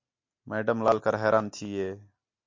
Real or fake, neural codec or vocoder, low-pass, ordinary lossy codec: real; none; 7.2 kHz; MP3, 48 kbps